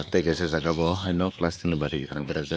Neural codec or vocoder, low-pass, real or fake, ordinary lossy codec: codec, 16 kHz, 2 kbps, X-Codec, WavLM features, trained on Multilingual LibriSpeech; none; fake; none